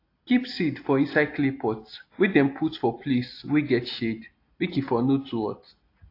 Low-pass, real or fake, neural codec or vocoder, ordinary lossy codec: 5.4 kHz; real; none; AAC, 32 kbps